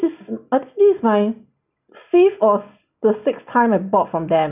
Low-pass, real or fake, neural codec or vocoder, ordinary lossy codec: 3.6 kHz; real; none; none